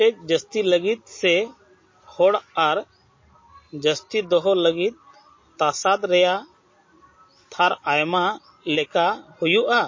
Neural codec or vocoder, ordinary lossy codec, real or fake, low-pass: none; MP3, 32 kbps; real; 7.2 kHz